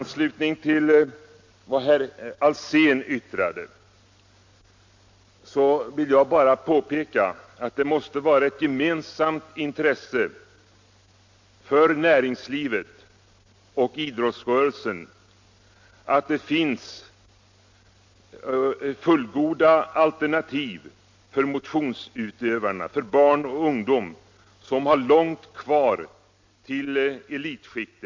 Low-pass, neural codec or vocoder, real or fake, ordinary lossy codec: 7.2 kHz; none; real; MP3, 48 kbps